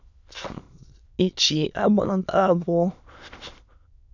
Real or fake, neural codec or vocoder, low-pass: fake; autoencoder, 22.05 kHz, a latent of 192 numbers a frame, VITS, trained on many speakers; 7.2 kHz